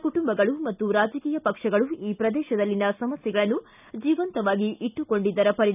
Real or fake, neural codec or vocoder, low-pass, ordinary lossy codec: real; none; 3.6 kHz; none